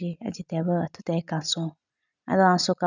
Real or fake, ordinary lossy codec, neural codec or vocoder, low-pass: real; none; none; 7.2 kHz